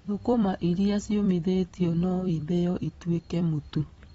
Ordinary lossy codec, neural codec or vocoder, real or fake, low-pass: AAC, 24 kbps; vocoder, 44.1 kHz, 128 mel bands every 256 samples, BigVGAN v2; fake; 19.8 kHz